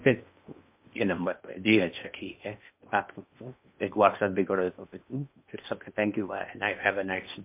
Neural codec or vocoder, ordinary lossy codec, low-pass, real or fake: codec, 16 kHz in and 24 kHz out, 0.8 kbps, FocalCodec, streaming, 65536 codes; MP3, 32 kbps; 3.6 kHz; fake